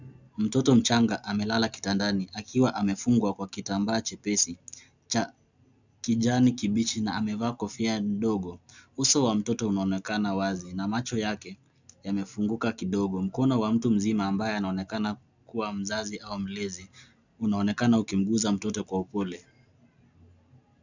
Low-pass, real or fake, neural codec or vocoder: 7.2 kHz; real; none